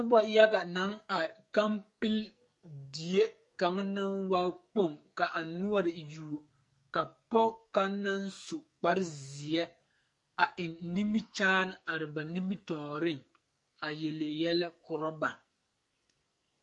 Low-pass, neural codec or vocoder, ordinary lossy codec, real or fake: 10.8 kHz; codec, 44.1 kHz, 2.6 kbps, SNAC; MP3, 48 kbps; fake